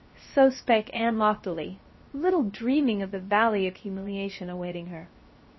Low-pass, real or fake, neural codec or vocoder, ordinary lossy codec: 7.2 kHz; fake; codec, 16 kHz, 0.7 kbps, FocalCodec; MP3, 24 kbps